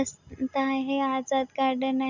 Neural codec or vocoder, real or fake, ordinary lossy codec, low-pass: none; real; none; 7.2 kHz